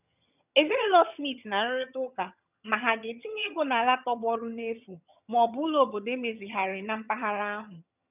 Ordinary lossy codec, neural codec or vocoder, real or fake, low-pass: none; vocoder, 22.05 kHz, 80 mel bands, HiFi-GAN; fake; 3.6 kHz